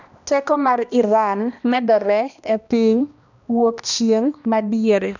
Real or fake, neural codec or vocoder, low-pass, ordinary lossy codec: fake; codec, 16 kHz, 1 kbps, X-Codec, HuBERT features, trained on balanced general audio; 7.2 kHz; none